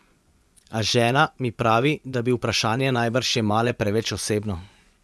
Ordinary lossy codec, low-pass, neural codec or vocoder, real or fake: none; none; vocoder, 24 kHz, 100 mel bands, Vocos; fake